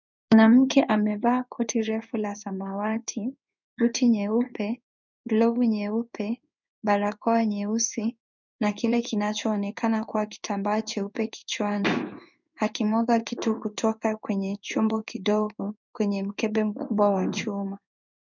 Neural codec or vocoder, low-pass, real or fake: codec, 16 kHz in and 24 kHz out, 1 kbps, XY-Tokenizer; 7.2 kHz; fake